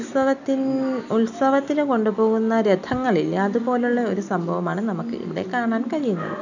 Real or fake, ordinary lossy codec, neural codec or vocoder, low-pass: real; none; none; 7.2 kHz